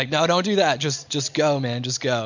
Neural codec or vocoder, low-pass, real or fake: none; 7.2 kHz; real